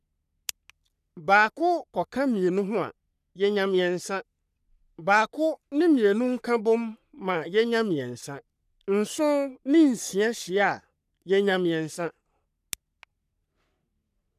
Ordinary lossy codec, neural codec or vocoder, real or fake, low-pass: none; codec, 44.1 kHz, 3.4 kbps, Pupu-Codec; fake; 14.4 kHz